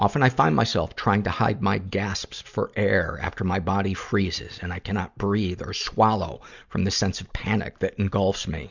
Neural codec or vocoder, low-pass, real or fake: none; 7.2 kHz; real